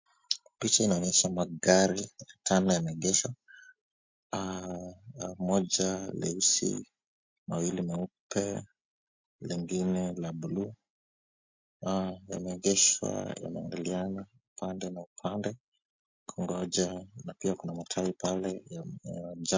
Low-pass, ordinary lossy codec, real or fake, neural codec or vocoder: 7.2 kHz; MP3, 48 kbps; real; none